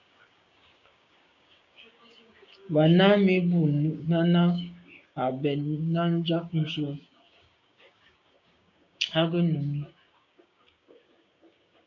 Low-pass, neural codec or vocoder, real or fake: 7.2 kHz; codec, 16 kHz, 6 kbps, DAC; fake